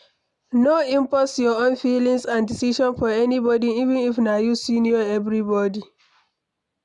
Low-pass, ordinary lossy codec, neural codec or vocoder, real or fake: 10.8 kHz; none; none; real